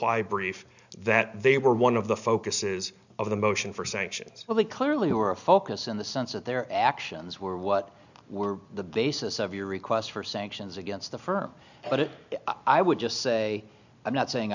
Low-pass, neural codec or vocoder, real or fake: 7.2 kHz; none; real